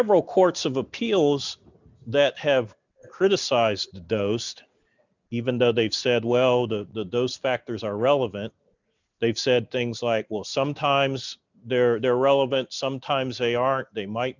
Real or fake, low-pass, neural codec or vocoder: real; 7.2 kHz; none